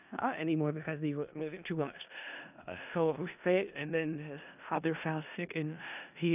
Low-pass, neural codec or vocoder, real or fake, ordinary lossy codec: 3.6 kHz; codec, 16 kHz in and 24 kHz out, 0.4 kbps, LongCat-Audio-Codec, four codebook decoder; fake; none